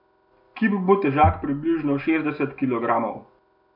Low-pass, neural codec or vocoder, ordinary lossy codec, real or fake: 5.4 kHz; none; none; real